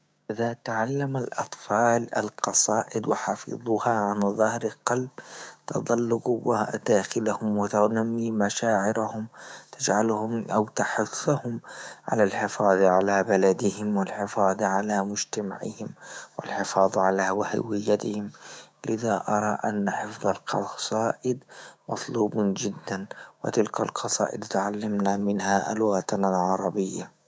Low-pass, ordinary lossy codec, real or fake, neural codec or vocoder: none; none; fake; codec, 16 kHz, 6 kbps, DAC